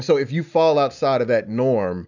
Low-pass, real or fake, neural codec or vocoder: 7.2 kHz; real; none